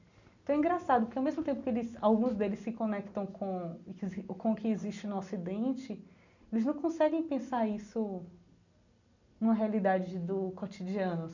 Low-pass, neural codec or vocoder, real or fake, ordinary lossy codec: 7.2 kHz; none; real; none